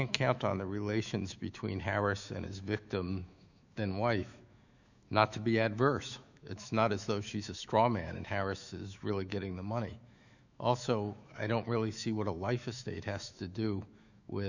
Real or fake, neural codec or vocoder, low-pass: fake; autoencoder, 48 kHz, 128 numbers a frame, DAC-VAE, trained on Japanese speech; 7.2 kHz